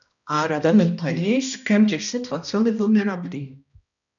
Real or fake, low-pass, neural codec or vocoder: fake; 7.2 kHz; codec, 16 kHz, 1 kbps, X-Codec, HuBERT features, trained on balanced general audio